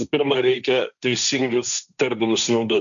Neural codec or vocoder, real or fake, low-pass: codec, 16 kHz, 1.1 kbps, Voila-Tokenizer; fake; 7.2 kHz